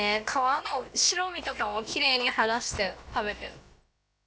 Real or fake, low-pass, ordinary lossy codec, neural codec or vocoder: fake; none; none; codec, 16 kHz, about 1 kbps, DyCAST, with the encoder's durations